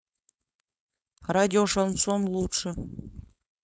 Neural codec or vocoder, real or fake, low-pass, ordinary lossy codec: codec, 16 kHz, 4.8 kbps, FACodec; fake; none; none